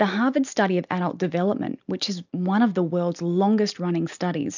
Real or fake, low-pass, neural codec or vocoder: real; 7.2 kHz; none